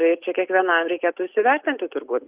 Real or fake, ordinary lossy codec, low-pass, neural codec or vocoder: real; Opus, 32 kbps; 3.6 kHz; none